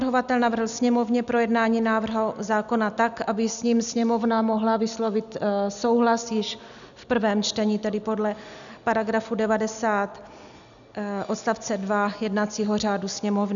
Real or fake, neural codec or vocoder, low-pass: real; none; 7.2 kHz